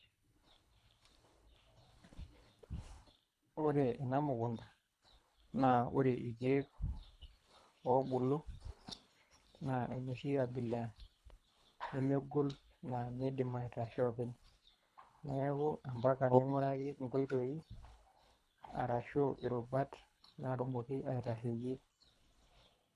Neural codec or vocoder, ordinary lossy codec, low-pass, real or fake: codec, 24 kHz, 3 kbps, HILCodec; none; none; fake